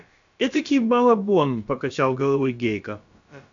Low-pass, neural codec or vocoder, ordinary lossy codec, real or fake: 7.2 kHz; codec, 16 kHz, about 1 kbps, DyCAST, with the encoder's durations; AAC, 64 kbps; fake